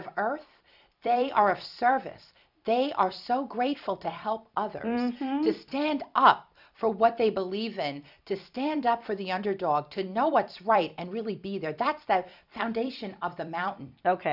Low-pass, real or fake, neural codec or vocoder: 5.4 kHz; fake; vocoder, 44.1 kHz, 128 mel bands every 256 samples, BigVGAN v2